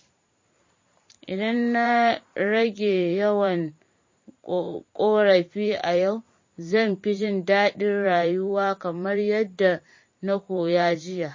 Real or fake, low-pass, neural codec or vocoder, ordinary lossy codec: fake; 7.2 kHz; vocoder, 44.1 kHz, 128 mel bands every 512 samples, BigVGAN v2; MP3, 32 kbps